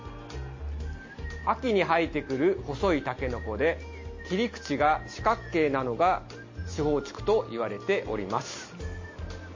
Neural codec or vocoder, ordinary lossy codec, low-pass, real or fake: none; MP3, 32 kbps; 7.2 kHz; real